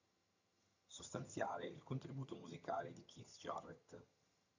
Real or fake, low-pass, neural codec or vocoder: fake; 7.2 kHz; vocoder, 22.05 kHz, 80 mel bands, HiFi-GAN